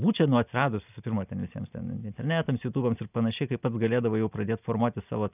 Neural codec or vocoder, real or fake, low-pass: none; real; 3.6 kHz